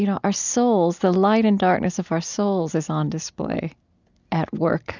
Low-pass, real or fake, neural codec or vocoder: 7.2 kHz; real; none